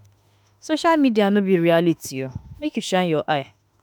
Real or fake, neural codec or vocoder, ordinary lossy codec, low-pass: fake; autoencoder, 48 kHz, 32 numbers a frame, DAC-VAE, trained on Japanese speech; none; none